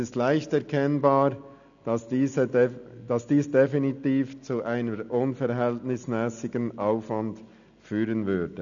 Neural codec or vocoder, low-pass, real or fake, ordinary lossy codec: none; 7.2 kHz; real; none